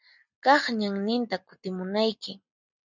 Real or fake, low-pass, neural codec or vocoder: real; 7.2 kHz; none